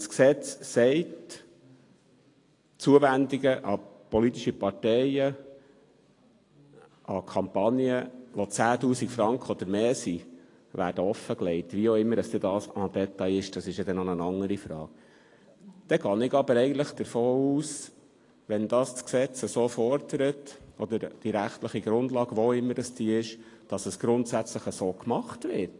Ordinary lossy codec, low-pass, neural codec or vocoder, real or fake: AAC, 48 kbps; 10.8 kHz; none; real